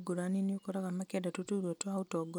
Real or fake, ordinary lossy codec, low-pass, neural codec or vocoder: real; none; none; none